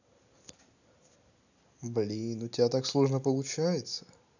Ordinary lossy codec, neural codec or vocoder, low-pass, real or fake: none; none; 7.2 kHz; real